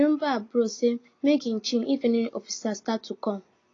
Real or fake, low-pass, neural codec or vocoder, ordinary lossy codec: real; 7.2 kHz; none; AAC, 32 kbps